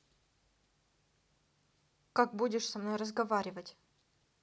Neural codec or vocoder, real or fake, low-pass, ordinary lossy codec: none; real; none; none